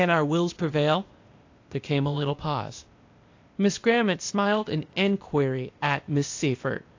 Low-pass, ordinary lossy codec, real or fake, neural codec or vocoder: 7.2 kHz; AAC, 48 kbps; fake; codec, 16 kHz, 0.8 kbps, ZipCodec